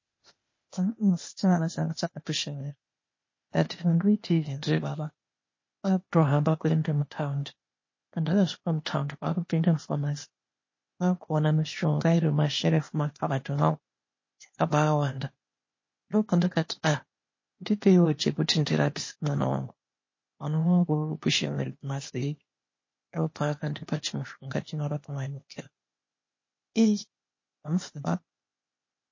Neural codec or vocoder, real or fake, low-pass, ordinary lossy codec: codec, 16 kHz, 0.8 kbps, ZipCodec; fake; 7.2 kHz; MP3, 32 kbps